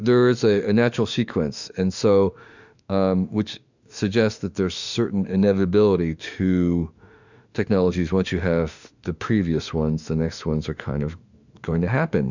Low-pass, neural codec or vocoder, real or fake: 7.2 kHz; autoencoder, 48 kHz, 32 numbers a frame, DAC-VAE, trained on Japanese speech; fake